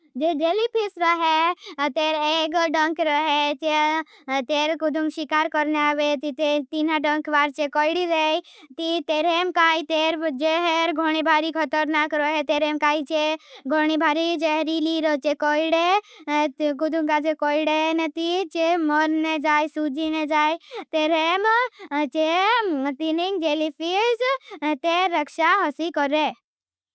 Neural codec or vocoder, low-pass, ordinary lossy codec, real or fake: none; none; none; real